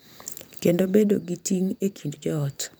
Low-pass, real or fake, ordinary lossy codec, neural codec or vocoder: none; fake; none; vocoder, 44.1 kHz, 128 mel bands every 512 samples, BigVGAN v2